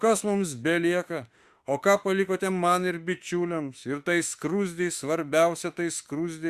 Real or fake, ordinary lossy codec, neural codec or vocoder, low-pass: fake; Opus, 64 kbps; autoencoder, 48 kHz, 32 numbers a frame, DAC-VAE, trained on Japanese speech; 14.4 kHz